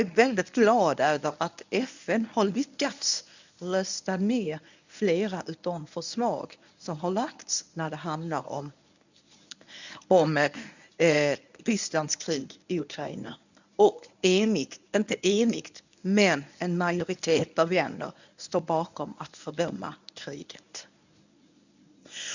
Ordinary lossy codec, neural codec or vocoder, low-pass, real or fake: none; codec, 24 kHz, 0.9 kbps, WavTokenizer, medium speech release version 1; 7.2 kHz; fake